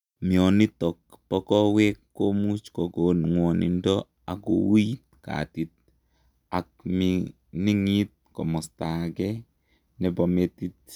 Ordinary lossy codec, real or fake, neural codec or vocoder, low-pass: none; real; none; 19.8 kHz